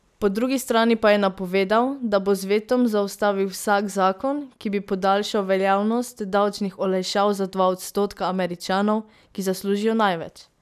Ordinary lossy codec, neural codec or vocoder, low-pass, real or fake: none; none; 14.4 kHz; real